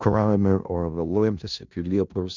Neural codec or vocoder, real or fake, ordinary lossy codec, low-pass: codec, 16 kHz in and 24 kHz out, 0.4 kbps, LongCat-Audio-Codec, four codebook decoder; fake; MP3, 64 kbps; 7.2 kHz